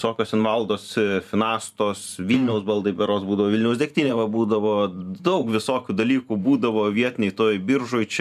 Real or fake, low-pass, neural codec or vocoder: fake; 14.4 kHz; vocoder, 44.1 kHz, 128 mel bands every 512 samples, BigVGAN v2